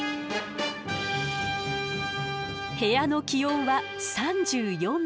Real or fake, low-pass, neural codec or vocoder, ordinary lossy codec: real; none; none; none